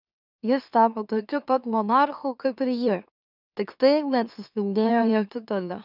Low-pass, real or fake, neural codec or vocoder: 5.4 kHz; fake; autoencoder, 44.1 kHz, a latent of 192 numbers a frame, MeloTTS